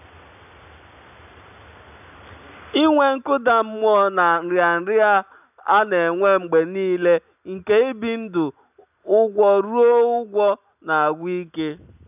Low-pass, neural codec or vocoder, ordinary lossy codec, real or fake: 3.6 kHz; none; none; real